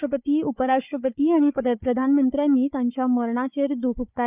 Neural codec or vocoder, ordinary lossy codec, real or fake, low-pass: codec, 16 kHz, 4 kbps, FunCodec, trained on Chinese and English, 50 frames a second; none; fake; 3.6 kHz